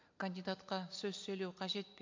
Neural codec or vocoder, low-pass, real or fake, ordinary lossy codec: none; 7.2 kHz; real; MP3, 48 kbps